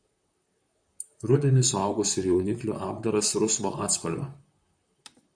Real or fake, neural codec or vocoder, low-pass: fake; vocoder, 44.1 kHz, 128 mel bands, Pupu-Vocoder; 9.9 kHz